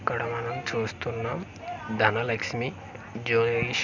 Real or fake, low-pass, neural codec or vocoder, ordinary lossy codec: real; 7.2 kHz; none; Opus, 64 kbps